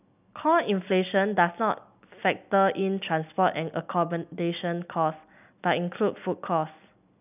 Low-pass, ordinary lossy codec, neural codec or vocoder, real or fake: 3.6 kHz; none; none; real